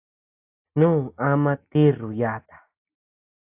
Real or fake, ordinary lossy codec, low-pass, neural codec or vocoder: real; AAC, 32 kbps; 3.6 kHz; none